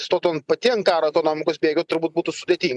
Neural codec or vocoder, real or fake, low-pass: none; real; 10.8 kHz